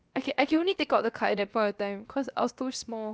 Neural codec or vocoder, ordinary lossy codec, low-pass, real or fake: codec, 16 kHz, 0.7 kbps, FocalCodec; none; none; fake